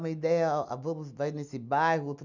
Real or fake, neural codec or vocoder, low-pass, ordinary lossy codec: real; none; 7.2 kHz; none